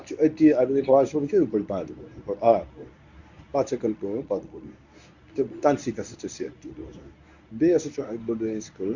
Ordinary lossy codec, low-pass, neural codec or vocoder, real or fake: none; 7.2 kHz; codec, 24 kHz, 0.9 kbps, WavTokenizer, medium speech release version 1; fake